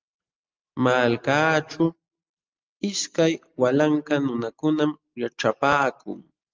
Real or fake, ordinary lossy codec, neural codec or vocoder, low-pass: real; Opus, 24 kbps; none; 7.2 kHz